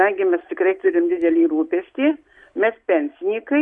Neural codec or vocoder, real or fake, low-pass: none; real; 10.8 kHz